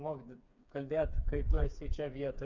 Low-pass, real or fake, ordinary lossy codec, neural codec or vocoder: 7.2 kHz; fake; MP3, 48 kbps; codec, 16 kHz, 4 kbps, FreqCodec, smaller model